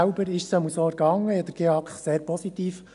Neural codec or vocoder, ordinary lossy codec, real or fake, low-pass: none; none; real; 10.8 kHz